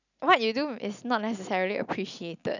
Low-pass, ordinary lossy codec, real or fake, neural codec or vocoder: 7.2 kHz; none; real; none